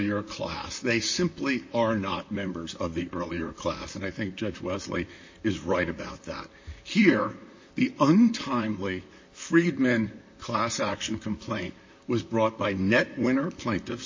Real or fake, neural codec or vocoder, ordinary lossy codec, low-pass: fake; vocoder, 44.1 kHz, 128 mel bands, Pupu-Vocoder; MP3, 32 kbps; 7.2 kHz